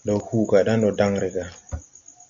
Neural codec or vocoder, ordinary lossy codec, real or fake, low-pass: none; Opus, 64 kbps; real; 7.2 kHz